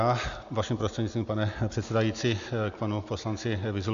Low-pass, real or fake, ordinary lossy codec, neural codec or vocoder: 7.2 kHz; real; AAC, 96 kbps; none